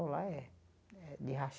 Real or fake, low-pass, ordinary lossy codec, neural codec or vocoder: real; none; none; none